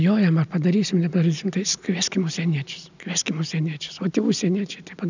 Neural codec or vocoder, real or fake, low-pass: none; real; 7.2 kHz